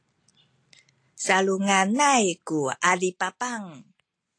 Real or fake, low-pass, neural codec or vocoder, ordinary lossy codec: real; 9.9 kHz; none; AAC, 48 kbps